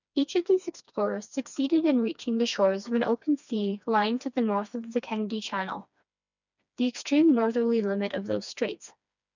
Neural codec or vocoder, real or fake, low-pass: codec, 16 kHz, 2 kbps, FreqCodec, smaller model; fake; 7.2 kHz